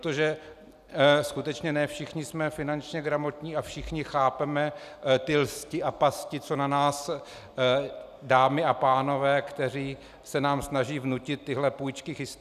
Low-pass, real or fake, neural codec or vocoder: 14.4 kHz; real; none